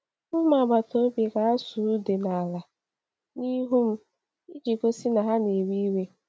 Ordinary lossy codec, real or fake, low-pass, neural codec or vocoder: none; real; none; none